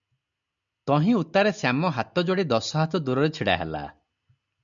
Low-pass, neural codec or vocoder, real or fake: 7.2 kHz; none; real